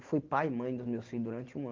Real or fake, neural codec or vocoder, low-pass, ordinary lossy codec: real; none; 7.2 kHz; Opus, 16 kbps